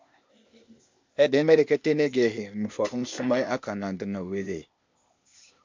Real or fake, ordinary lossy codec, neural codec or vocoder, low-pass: fake; MP3, 64 kbps; codec, 16 kHz, 0.8 kbps, ZipCodec; 7.2 kHz